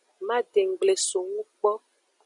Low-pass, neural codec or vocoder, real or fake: 10.8 kHz; none; real